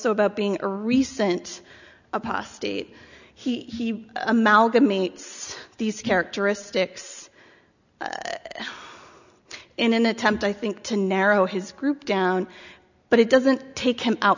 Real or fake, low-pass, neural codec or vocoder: real; 7.2 kHz; none